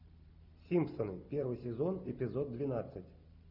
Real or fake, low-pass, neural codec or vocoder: real; 5.4 kHz; none